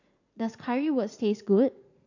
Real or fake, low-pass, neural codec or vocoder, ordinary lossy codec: fake; 7.2 kHz; vocoder, 44.1 kHz, 80 mel bands, Vocos; none